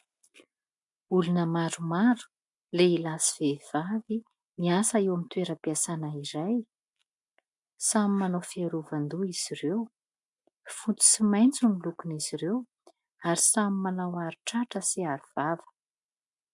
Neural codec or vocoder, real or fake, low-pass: none; real; 10.8 kHz